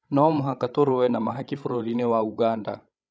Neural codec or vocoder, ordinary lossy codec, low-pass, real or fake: codec, 16 kHz, 16 kbps, FreqCodec, larger model; none; none; fake